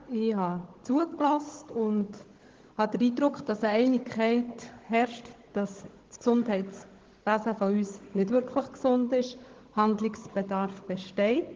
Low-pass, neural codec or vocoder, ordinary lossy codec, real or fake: 7.2 kHz; codec, 16 kHz, 16 kbps, FunCodec, trained on Chinese and English, 50 frames a second; Opus, 16 kbps; fake